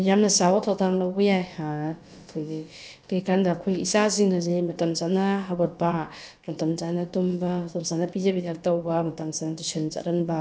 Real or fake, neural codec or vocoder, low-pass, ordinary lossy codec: fake; codec, 16 kHz, about 1 kbps, DyCAST, with the encoder's durations; none; none